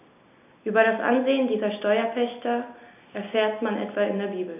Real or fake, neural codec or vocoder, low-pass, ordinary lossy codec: real; none; 3.6 kHz; none